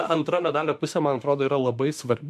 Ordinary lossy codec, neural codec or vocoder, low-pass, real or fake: MP3, 64 kbps; autoencoder, 48 kHz, 32 numbers a frame, DAC-VAE, trained on Japanese speech; 14.4 kHz; fake